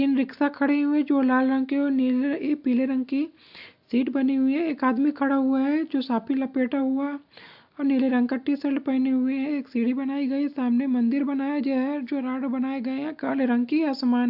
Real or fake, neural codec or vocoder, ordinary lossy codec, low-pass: real; none; AAC, 48 kbps; 5.4 kHz